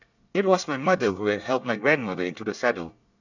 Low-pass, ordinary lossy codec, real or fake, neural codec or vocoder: 7.2 kHz; none; fake; codec, 24 kHz, 1 kbps, SNAC